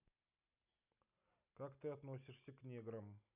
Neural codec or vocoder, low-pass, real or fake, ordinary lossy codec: none; 3.6 kHz; real; none